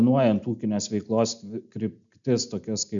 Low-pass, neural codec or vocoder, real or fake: 7.2 kHz; none; real